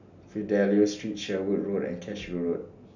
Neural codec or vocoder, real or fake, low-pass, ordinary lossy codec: none; real; 7.2 kHz; none